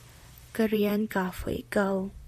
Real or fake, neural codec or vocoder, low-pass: fake; vocoder, 44.1 kHz, 128 mel bands, Pupu-Vocoder; 14.4 kHz